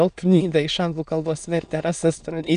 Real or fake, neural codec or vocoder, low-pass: fake; autoencoder, 22.05 kHz, a latent of 192 numbers a frame, VITS, trained on many speakers; 9.9 kHz